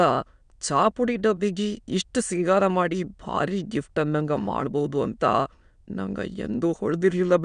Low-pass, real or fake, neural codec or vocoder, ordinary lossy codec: 9.9 kHz; fake; autoencoder, 22.05 kHz, a latent of 192 numbers a frame, VITS, trained on many speakers; none